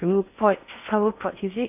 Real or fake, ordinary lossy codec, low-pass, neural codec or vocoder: fake; AAC, 32 kbps; 3.6 kHz; codec, 16 kHz in and 24 kHz out, 0.6 kbps, FocalCodec, streaming, 4096 codes